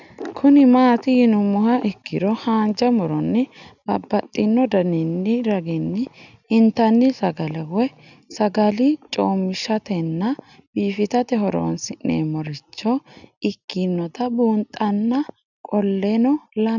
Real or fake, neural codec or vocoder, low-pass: real; none; 7.2 kHz